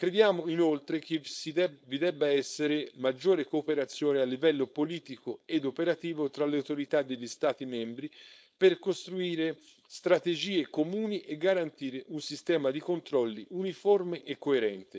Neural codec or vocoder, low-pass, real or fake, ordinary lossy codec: codec, 16 kHz, 4.8 kbps, FACodec; none; fake; none